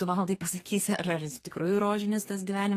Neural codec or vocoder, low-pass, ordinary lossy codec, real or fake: codec, 32 kHz, 1.9 kbps, SNAC; 14.4 kHz; AAC, 48 kbps; fake